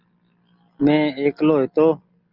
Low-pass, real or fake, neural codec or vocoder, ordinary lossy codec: 5.4 kHz; real; none; Opus, 32 kbps